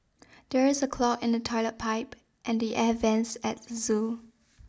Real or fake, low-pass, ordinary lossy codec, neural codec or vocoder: real; none; none; none